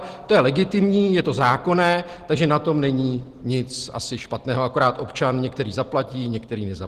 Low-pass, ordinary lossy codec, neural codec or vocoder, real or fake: 14.4 kHz; Opus, 16 kbps; none; real